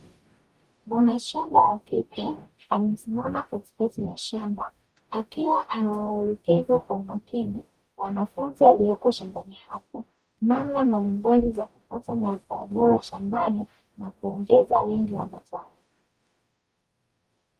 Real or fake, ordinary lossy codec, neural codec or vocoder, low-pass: fake; Opus, 32 kbps; codec, 44.1 kHz, 0.9 kbps, DAC; 14.4 kHz